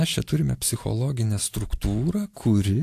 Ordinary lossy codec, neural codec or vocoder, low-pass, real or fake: AAC, 64 kbps; none; 14.4 kHz; real